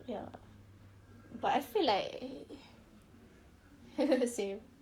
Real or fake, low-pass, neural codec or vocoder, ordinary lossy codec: fake; 19.8 kHz; codec, 44.1 kHz, 7.8 kbps, Pupu-Codec; Opus, 64 kbps